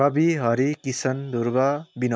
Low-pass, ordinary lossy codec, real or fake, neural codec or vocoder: none; none; real; none